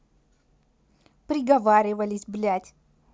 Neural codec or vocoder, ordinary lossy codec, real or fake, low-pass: none; none; real; none